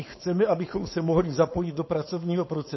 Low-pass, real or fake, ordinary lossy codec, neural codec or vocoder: 7.2 kHz; fake; MP3, 24 kbps; codec, 16 kHz, 4.8 kbps, FACodec